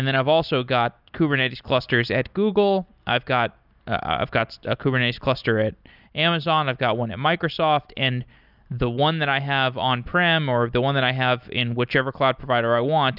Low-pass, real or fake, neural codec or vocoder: 5.4 kHz; real; none